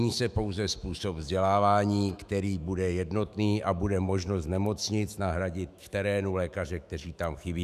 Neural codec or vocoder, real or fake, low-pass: autoencoder, 48 kHz, 128 numbers a frame, DAC-VAE, trained on Japanese speech; fake; 14.4 kHz